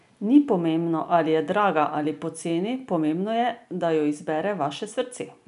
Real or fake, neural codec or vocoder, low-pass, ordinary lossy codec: real; none; 10.8 kHz; none